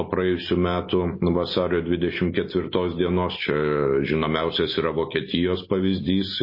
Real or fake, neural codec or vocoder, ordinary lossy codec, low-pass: real; none; MP3, 24 kbps; 5.4 kHz